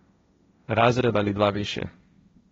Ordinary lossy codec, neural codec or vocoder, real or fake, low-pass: AAC, 24 kbps; codec, 16 kHz, 1.1 kbps, Voila-Tokenizer; fake; 7.2 kHz